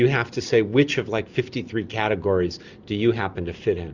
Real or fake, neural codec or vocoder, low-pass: real; none; 7.2 kHz